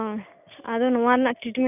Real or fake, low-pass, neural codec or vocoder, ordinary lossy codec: real; 3.6 kHz; none; none